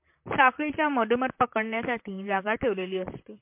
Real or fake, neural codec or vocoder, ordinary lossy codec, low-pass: fake; vocoder, 44.1 kHz, 128 mel bands, Pupu-Vocoder; MP3, 32 kbps; 3.6 kHz